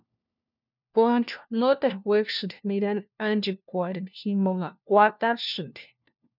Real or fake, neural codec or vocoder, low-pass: fake; codec, 16 kHz, 1 kbps, FunCodec, trained on LibriTTS, 50 frames a second; 5.4 kHz